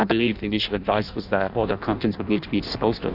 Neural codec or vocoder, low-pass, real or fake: codec, 16 kHz in and 24 kHz out, 0.6 kbps, FireRedTTS-2 codec; 5.4 kHz; fake